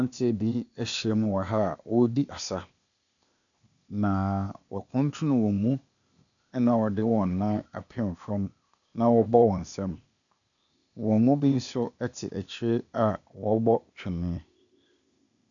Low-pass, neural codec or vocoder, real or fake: 7.2 kHz; codec, 16 kHz, 0.8 kbps, ZipCodec; fake